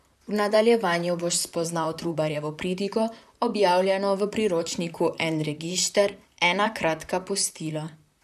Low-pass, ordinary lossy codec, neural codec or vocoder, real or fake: 14.4 kHz; none; vocoder, 44.1 kHz, 128 mel bands, Pupu-Vocoder; fake